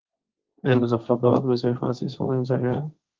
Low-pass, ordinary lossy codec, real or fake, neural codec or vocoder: 7.2 kHz; Opus, 24 kbps; fake; codec, 16 kHz, 1.1 kbps, Voila-Tokenizer